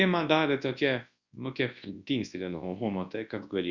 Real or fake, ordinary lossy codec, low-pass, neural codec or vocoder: fake; Opus, 64 kbps; 7.2 kHz; codec, 24 kHz, 0.9 kbps, WavTokenizer, large speech release